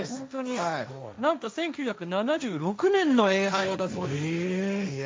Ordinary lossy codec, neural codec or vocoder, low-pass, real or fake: none; codec, 16 kHz, 1.1 kbps, Voila-Tokenizer; none; fake